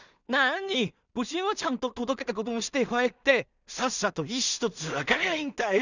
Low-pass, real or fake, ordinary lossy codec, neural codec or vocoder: 7.2 kHz; fake; none; codec, 16 kHz in and 24 kHz out, 0.4 kbps, LongCat-Audio-Codec, two codebook decoder